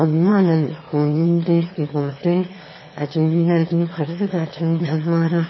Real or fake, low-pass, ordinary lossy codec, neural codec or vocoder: fake; 7.2 kHz; MP3, 24 kbps; autoencoder, 22.05 kHz, a latent of 192 numbers a frame, VITS, trained on one speaker